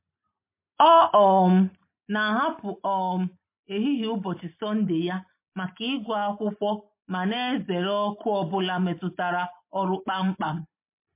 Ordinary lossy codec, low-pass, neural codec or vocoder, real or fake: MP3, 24 kbps; 3.6 kHz; none; real